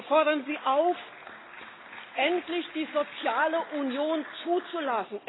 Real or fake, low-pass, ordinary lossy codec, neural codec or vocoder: real; 7.2 kHz; AAC, 16 kbps; none